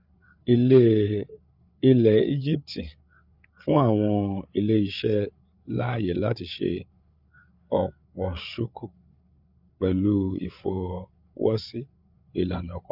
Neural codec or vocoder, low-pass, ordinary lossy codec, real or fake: codec, 16 kHz, 8 kbps, FreqCodec, larger model; 5.4 kHz; none; fake